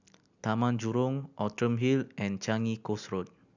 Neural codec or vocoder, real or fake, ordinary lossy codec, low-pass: none; real; none; 7.2 kHz